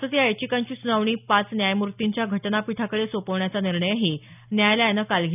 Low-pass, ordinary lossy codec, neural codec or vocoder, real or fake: 3.6 kHz; none; none; real